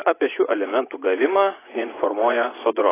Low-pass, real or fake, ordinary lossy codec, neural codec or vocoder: 3.6 kHz; fake; AAC, 16 kbps; vocoder, 24 kHz, 100 mel bands, Vocos